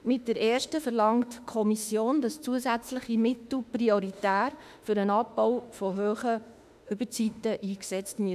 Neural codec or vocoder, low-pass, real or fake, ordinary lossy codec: autoencoder, 48 kHz, 32 numbers a frame, DAC-VAE, trained on Japanese speech; 14.4 kHz; fake; none